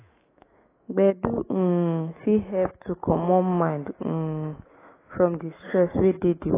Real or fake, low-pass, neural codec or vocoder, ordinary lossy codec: fake; 3.6 kHz; autoencoder, 48 kHz, 128 numbers a frame, DAC-VAE, trained on Japanese speech; AAC, 16 kbps